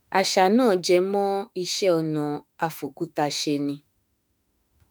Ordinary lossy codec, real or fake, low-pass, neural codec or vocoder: none; fake; none; autoencoder, 48 kHz, 32 numbers a frame, DAC-VAE, trained on Japanese speech